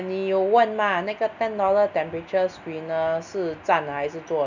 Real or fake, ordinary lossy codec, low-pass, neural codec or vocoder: real; none; 7.2 kHz; none